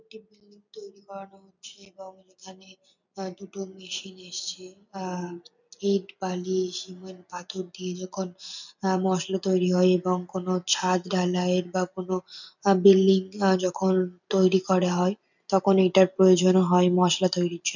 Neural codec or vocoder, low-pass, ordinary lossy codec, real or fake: none; 7.2 kHz; none; real